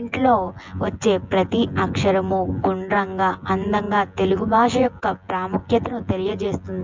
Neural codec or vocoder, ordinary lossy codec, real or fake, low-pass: vocoder, 24 kHz, 100 mel bands, Vocos; MP3, 64 kbps; fake; 7.2 kHz